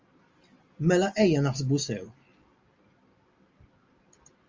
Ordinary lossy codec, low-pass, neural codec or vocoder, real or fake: Opus, 32 kbps; 7.2 kHz; none; real